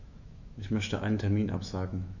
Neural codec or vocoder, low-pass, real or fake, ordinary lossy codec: autoencoder, 48 kHz, 128 numbers a frame, DAC-VAE, trained on Japanese speech; 7.2 kHz; fake; none